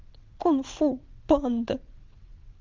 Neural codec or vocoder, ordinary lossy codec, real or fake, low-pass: vocoder, 22.05 kHz, 80 mel bands, WaveNeXt; Opus, 24 kbps; fake; 7.2 kHz